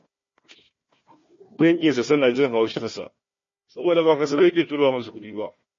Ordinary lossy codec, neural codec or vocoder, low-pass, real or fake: MP3, 32 kbps; codec, 16 kHz, 1 kbps, FunCodec, trained on Chinese and English, 50 frames a second; 7.2 kHz; fake